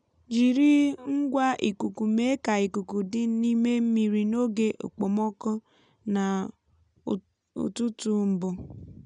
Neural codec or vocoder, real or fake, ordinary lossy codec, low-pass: none; real; none; 9.9 kHz